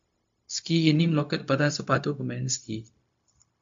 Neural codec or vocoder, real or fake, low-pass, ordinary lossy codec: codec, 16 kHz, 0.4 kbps, LongCat-Audio-Codec; fake; 7.2 kHz; MP3, 48 kbps